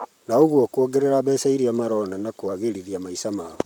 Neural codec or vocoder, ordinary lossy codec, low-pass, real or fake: vocoder, 44.1 kHz, 128 mel bands, Pupu-Vocoder; none; 19.8 kHz; fake